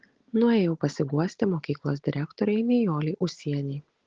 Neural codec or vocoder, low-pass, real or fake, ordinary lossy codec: none; 7.2 kHz; real; Opus, 16 kbps